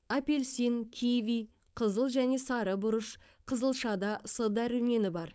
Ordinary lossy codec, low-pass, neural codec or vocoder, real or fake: none; none; codec, 16 kHz, 4.8 kbps, FACodec; fake